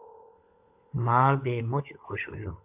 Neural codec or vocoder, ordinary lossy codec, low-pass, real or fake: codec, 16 kHz, 16 kbps, FunCodec, trained on LibriTTS, 50 frames a second; AAC, 32 kbps; 3.6 kHz; fake